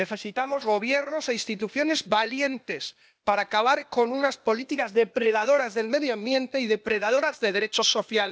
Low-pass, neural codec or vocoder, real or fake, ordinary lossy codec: none; codec, 16 kHz, 0.8 kbps, ZipCodec; fake; none